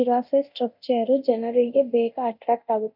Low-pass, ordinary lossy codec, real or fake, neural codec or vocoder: 5.4 kHz; none; fake; codec, 24 kHz, 0.9 kbps, DualCodec